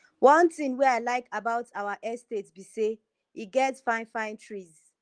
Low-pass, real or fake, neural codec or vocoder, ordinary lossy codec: 9.9 kHz; real; none; Opus, 24 kbps